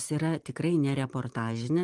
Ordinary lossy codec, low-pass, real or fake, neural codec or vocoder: Opus, 24 kbps; 10.8 kHz; fake; vocoder, 44.1 kHz, 128 mel bands every 512 samples, BigVGAN v2